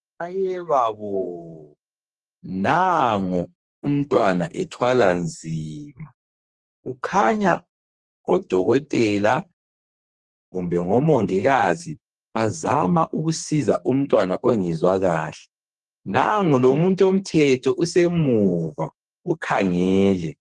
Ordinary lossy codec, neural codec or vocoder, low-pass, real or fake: Opus, 24 kbps; codec, 32 kHz, 1.9 kbps, SNAC; 10.8 kHz; fake